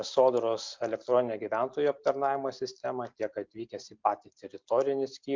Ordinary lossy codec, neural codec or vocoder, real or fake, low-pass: MP3, 64 kbps; none; real; 7.2 kHz